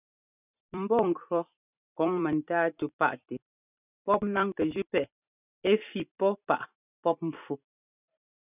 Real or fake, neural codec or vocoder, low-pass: fake; vocoder, 44.1 kHz, 128 mel bands every 256 samples, BigVGAN v2; 3.6 kHz